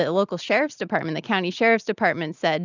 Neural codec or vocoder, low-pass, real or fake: none; 7.2 kHz; real